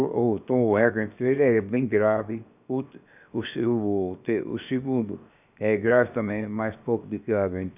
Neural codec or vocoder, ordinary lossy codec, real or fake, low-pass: codec, 16 kHz, 0.7 kbps, FocalCodec; none; fake; 3.6 kHz